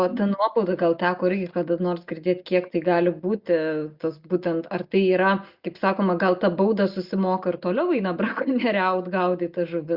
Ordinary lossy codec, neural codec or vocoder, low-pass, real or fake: Opus, 64 kbps; none; 5.4 kHz; real